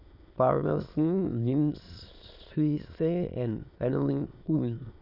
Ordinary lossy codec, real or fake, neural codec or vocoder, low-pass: none; fake; autoencoder, 22.05 kHz, a latent of 192 numbers a frame, VITS, trained on many speakers; 5.4 kHz